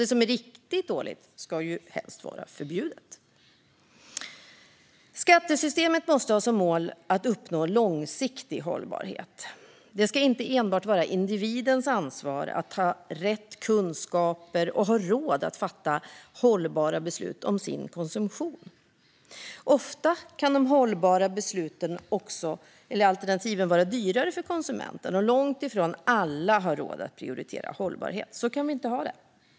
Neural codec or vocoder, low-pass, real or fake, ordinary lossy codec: none; none; real; none